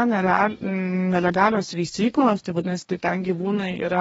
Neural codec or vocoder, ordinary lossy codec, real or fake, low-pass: codec, 44.1 kHz, 2.6 kbps, DAC; AAC, 24 kbps; fake; 19.8 kHz